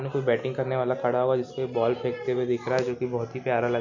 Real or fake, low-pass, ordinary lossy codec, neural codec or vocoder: real; 7.2 kHz; none; none